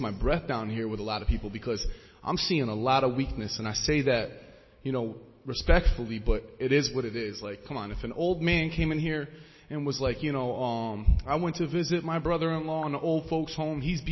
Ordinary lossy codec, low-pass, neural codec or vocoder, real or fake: MP3, 24 kbps; 7.2 kHz; none; real